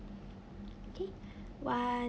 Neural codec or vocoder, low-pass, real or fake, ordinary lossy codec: none; none; real; none